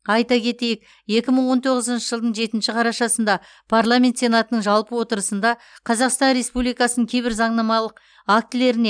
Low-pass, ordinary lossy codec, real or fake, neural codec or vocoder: 9.9 kHz; none; real; none